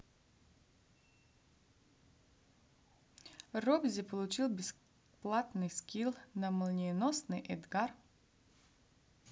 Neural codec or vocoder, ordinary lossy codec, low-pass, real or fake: none; none; none; real